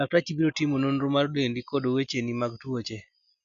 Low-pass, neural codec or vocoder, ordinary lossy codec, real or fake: 7.2 kHz; none; none; real